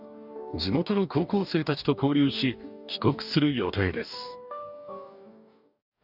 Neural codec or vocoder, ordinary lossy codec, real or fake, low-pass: codec, 44.1 kHz, 2.6 kbps, DAC; none; fake; 5.4 kHz